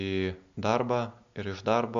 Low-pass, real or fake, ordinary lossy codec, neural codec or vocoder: 7.2 kHz; real; MP3, 64 kbps; none